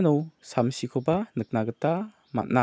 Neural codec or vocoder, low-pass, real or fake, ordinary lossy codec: none; none; real; none